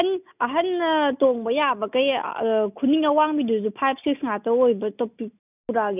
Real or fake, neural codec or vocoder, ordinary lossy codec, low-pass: real; none; none; 3.6 kHz